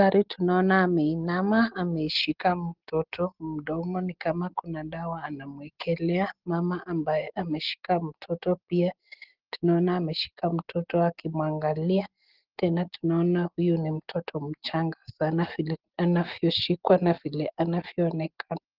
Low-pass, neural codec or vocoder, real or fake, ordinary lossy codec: 5.4 kHz; none; real; Opus, 16 kbps